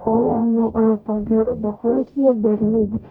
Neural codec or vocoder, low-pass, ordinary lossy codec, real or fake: codec, 44.1 kHz, 0.9 kbps, DAC; 19.8 kHz; none; fake